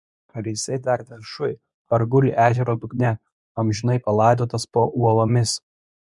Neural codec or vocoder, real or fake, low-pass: codec, 24 kHz, 0.9 kbps, WavTokenizer, medium speech release version 2; fake; 10.8 kHz